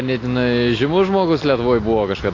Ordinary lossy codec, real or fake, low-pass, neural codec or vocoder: AAC, 32 kbps; real; 7.2 kHz; none